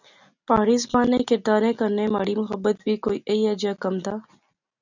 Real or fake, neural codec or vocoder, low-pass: real; none; 7.2 kHz